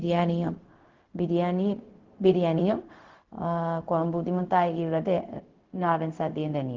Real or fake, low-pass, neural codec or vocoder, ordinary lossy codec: fake; 7.2 kHz; codec, 16 kHz, 0.4 kbps, LongCat-Audio-Codec; Opus, 16 kbps